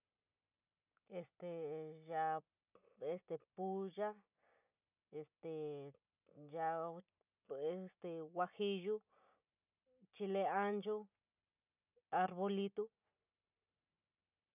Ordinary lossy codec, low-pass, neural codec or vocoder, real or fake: none; 3.6 kHz; none; real